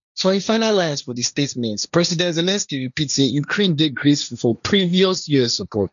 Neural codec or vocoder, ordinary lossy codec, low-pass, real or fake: codec, 16 kHz, 1.1 kbps, Voila-Tokenizer; none; 7.2 kHz; fake